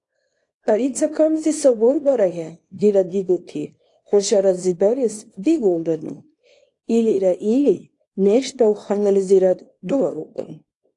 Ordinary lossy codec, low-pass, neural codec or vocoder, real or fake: AAC, 48 kbps; 10.8 kHz; codec, 24 kHz, 0.9 kbps, WavTokenizer, small release; fake